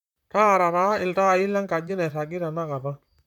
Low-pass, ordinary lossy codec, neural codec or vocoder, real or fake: 19.8 kHz; none; vocoder, 44.1 kHz, 128 mel bands, Pupu-Vocoder; fake